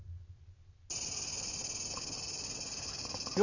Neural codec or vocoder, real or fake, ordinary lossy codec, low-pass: none; real; none; 7.2 kHz